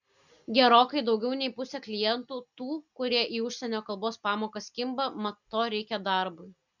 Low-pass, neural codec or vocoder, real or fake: 7.2 kHz; none; real